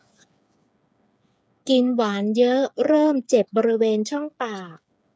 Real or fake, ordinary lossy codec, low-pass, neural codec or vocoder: fake; none; none; codec, 16 kHz, 8 kbps, FreqCodec, smaller model